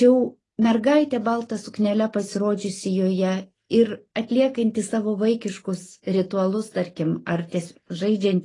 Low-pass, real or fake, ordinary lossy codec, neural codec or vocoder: 10.8 kHz; real; AAC, 32 kbps; none